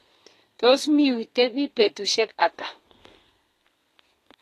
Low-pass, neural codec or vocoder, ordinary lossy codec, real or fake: 14.4 kHz; codec, 32 kHz, 1.9 kbps, SNAC; AAC, 48 kbps; fake